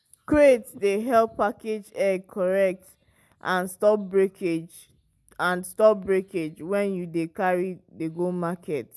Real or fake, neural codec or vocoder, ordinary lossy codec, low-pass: real; none; none; none